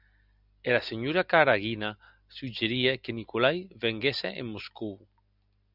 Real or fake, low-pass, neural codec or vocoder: real; 5.4 kHz; none